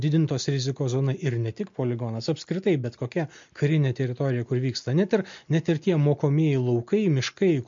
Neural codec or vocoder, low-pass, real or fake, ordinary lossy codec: none; 7.2 kHz; real; MP3, 48 kbps